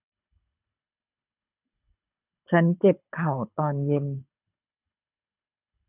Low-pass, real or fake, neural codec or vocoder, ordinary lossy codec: 3.6 kHz; fake; codec, 24 kHz, 6 kbps, HILCodec; none